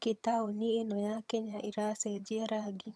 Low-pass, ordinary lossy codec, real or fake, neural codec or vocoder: none; none; fake; vocoder, 22.05 kHz, 80 mel bands, HiFi-GAN